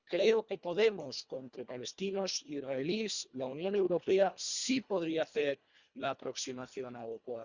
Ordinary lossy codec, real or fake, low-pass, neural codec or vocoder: Opus, 64 kbps; fake; 7.2 kHz; codec, 24 kHz, 1.5 kbps, HILCodec